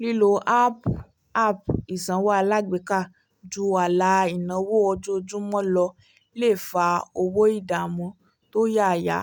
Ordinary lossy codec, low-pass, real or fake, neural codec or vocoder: none; 19.8 kHz; real; none